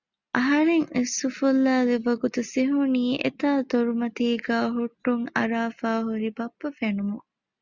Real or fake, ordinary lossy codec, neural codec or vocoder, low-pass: real; Opus, 64 kbps; none; 7.2 kHz